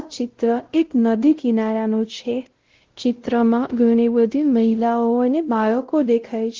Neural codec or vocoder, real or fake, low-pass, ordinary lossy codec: codec, 16 kHz, 0.5 kbps, X-Codec, WavLM features, trained on Multilingual LibriSpeech; fake; 7.2 kHz; Opus, 16 kbps